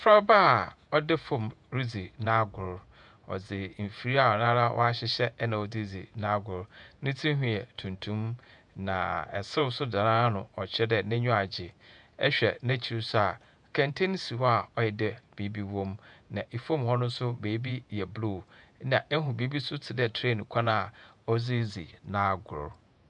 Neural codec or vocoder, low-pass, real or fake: vocoder, 48 kHz, 128 mel bands, Vocos; 10.8 kHz; fake